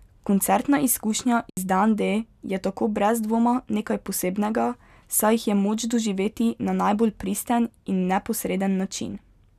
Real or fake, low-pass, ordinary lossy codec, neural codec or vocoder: real; 14.4 kHz; none; none